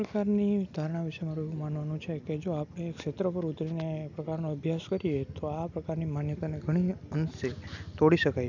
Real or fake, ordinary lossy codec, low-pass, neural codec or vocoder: real; none; 7.2 kHz; none